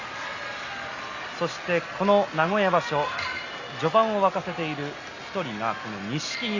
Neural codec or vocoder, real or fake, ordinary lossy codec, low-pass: none; real; Opus, 64 kbps; 7.2 kHz